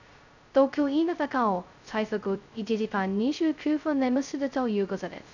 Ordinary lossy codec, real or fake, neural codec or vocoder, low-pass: none; fake; codec, 16 kHz, 0.2 kbps, FocalCodec; 7.2 kHz